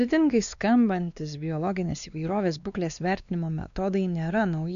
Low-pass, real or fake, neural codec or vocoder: 7.2 kHz; fake; codec, 16 kHz, 2 kbps, X-Codec, WavLM features, trained on Multilingual LibriSpeech